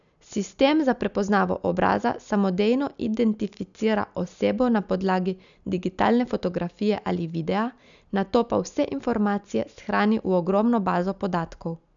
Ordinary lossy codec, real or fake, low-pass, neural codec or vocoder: none; real; 7.2 kHz; none